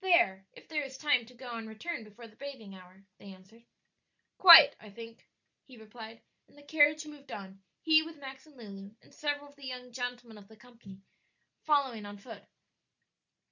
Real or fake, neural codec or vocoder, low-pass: real; none; 7.2 kHz